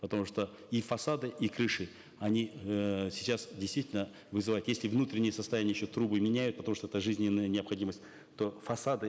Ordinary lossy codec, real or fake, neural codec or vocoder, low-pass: none; real; none; none